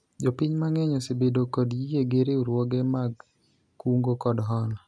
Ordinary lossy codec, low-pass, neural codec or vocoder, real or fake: none; none; none; real